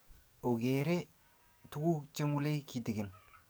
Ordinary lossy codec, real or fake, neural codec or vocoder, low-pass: none; fake; codec, 44.1 kHz, 7.8 kbps, DAC; none